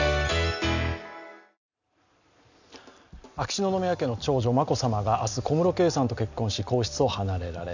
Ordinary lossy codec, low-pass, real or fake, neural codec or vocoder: none; 7.2 kHz; real; none